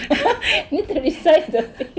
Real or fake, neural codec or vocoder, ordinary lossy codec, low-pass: real; none; none; none